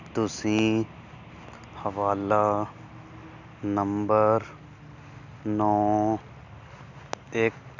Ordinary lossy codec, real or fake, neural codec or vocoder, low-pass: none; real; none; 7.2 kHz